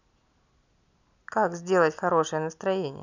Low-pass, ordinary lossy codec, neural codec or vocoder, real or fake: 7.2 kHz; none; none; real